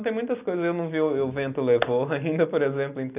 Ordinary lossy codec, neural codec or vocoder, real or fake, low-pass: none; none; real; 3.6 kHz